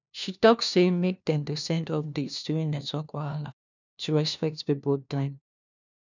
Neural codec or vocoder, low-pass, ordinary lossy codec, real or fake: codec, 16 kHz, 1 kbps, FunCodec, trained on LibriTTS, 50 frames a second; 7.2 kHz; none; fake